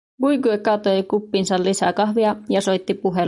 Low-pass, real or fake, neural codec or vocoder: 10.8 kHz; real; none